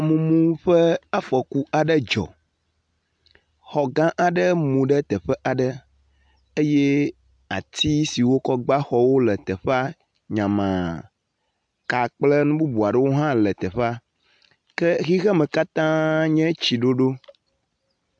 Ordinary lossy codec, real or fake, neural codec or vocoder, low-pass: AAC, 64 kbps; real; none; 9.9 kHz